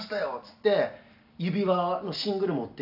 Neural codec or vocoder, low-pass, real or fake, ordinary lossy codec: none; 5.4 kHz; real; AAC, 48 kbps